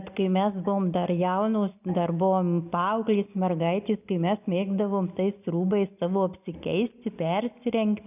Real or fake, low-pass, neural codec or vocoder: real; 3.6 kHz; none